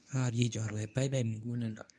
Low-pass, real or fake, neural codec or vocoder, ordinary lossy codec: none; fake; codec, 24 kHz, 0.9 kbps, WavTokenizer, medium speech release version 1; none